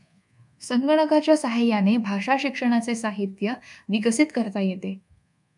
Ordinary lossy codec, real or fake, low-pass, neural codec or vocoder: MP3, 96 kbps; fake; 10.8 kHz; codec, 24 kHz, 1.2 kbps, DualCodec